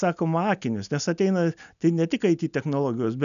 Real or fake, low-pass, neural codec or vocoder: real; 7.2 kHz; none